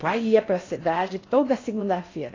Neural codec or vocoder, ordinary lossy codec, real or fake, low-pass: codec, 16 kHz in and 24 kHz out, 0.6 kbps, FocalCodec, streaming, 4096 codes; AAC, 32 kbps; fake; 7.2 kHz